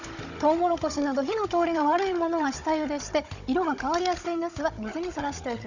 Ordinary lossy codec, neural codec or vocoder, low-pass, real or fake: none; codec, 16 kHz, 16 kbps, FunCodec, trained on Chinese and English, 50 frames a second; 7.2 kHz; fake